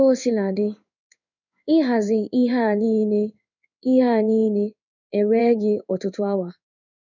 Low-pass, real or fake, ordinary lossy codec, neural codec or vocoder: 7.2 kHz; fake; none; codec, 16 kHz in and 24 kHz out, 1 kbps, XY-Tokenizer